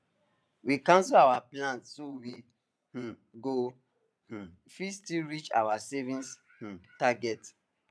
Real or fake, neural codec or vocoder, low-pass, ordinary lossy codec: fake; vocoder, 22.05 kHz, 80 mel bands, Vocos; none; none